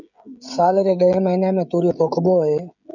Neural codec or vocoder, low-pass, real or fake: codec, 16 kHz, 16 kbps, FreqCodec, smaller model; 7.2 kHz; fake